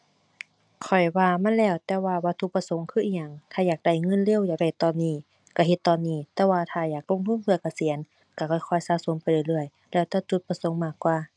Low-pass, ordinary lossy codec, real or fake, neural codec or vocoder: 9.9 kHz; none; real; none